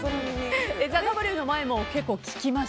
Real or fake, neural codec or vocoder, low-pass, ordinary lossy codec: real; none; none; none